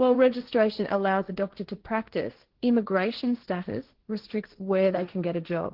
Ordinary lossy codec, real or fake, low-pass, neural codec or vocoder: Opus, 16 kbps; fake; 5.4 kHz; codec, 16 kHz, 1.1 kbps, Voila-Tokenizer